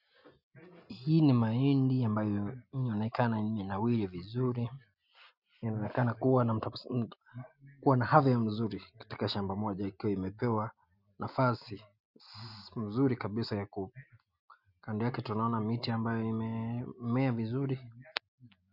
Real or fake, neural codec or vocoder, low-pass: real; none; 5.4 kHz